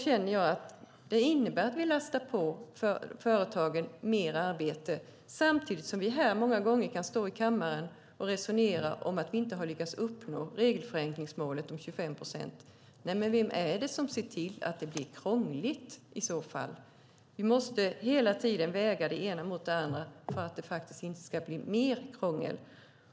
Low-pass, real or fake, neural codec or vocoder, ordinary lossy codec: none; real; none; none